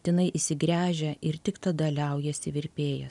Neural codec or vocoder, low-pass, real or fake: none; 10.8 kHz; real